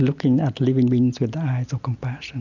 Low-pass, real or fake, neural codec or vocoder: 7.2 kHz; real; none